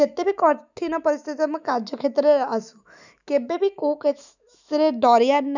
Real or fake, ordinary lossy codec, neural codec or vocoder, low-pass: fake; none; autoencoder, 48 kHz, 128 numbers a frame, DAC-VAE, trained on Japanese speech; 7.2 kHz